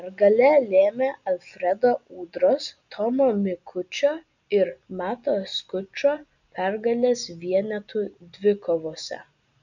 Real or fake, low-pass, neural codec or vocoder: real; 7.2 kHz; none